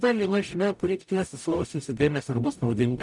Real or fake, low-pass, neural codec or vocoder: fake; 10.8 kHz; codec, 44.1 kHz, 0.9 kbps, DAC